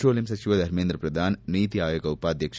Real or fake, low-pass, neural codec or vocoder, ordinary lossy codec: real; none; none; none